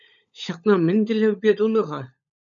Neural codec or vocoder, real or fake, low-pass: codec, 16 kHz, 16 kbps, FunCodec, trained on LibriTTS, 50 frames a second; fake; 7.2 kHz